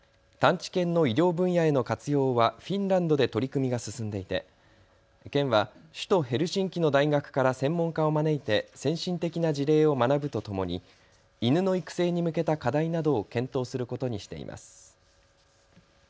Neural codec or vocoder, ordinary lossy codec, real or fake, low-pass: none; none; real; none